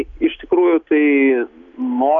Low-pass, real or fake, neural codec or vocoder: 7.2 kHz; real; none